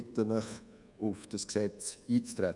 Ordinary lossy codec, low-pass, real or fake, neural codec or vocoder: none; 10.8 kHz; fake; codec, 24 kHz, 1.2 kbps, DualCodec